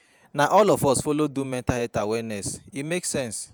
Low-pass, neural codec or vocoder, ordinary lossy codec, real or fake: none; vocoder, 48 kHz, 128 mel bands, Vocos; none; fake